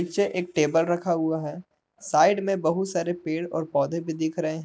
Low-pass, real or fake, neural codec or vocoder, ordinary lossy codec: none; real; none; none